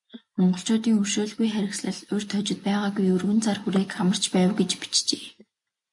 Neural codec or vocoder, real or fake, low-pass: none; real; 10.8 kHz